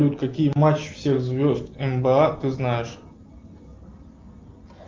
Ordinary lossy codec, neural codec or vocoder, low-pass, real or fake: Opus, 32 kbps; none; 7.2 kHz; real